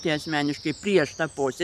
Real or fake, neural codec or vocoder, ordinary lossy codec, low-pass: fake; vocoder, 44.1 kHz, 128 mel bands every 512 samples, BigVGAN v2; MP3, 96 kbps; 14.4 kHz